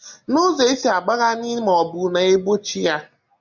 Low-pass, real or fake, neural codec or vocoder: 7.2 kHz; real; none